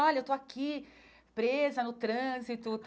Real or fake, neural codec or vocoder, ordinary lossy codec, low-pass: real; none; none; none